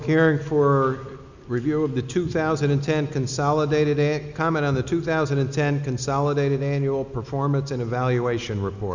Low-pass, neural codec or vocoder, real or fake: 7.2 kHz; none; real